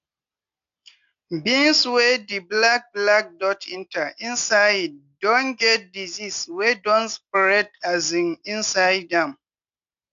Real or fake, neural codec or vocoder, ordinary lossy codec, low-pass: real; none; AAC, 64 kbps; 7.2 kHz